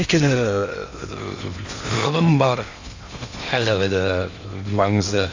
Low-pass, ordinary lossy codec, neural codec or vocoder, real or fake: 7.2 kHz; AAC, 48 kbps; codec, 16 kHz in and 24 kHz out, 0.6 kbps, FocalCodec, streaming, 4096 codes; fake